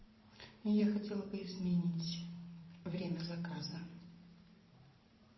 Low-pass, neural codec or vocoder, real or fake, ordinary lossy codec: 7.2 kHz; vocoder, 44.1 kHz, 128 mel bands every 512 samples, BigVGAN v2; fake; MP3, 24 kbps